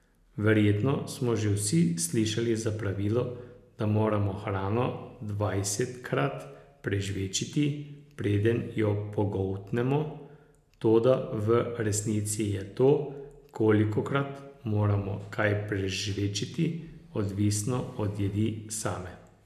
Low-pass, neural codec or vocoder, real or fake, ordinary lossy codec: 14.4 kHz; none; real; none